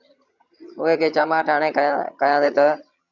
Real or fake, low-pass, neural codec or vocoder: fake; 7.2 kHz; vocoder, 22.05 kHz, 80 mel bands, HiFi-GAN